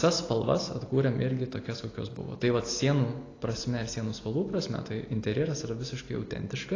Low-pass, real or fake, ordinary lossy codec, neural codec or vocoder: 7.2 kHz; real; AAC, 32 kbps; none